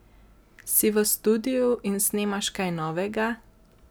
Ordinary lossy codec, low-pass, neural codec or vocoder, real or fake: none; none; none; real